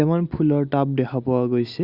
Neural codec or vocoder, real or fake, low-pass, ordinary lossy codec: none; real; 5.4 kHz; Opus, 64 kbps